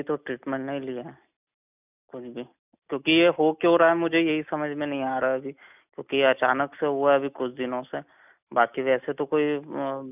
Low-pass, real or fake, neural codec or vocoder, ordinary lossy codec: 3.6 kHz; real; none; none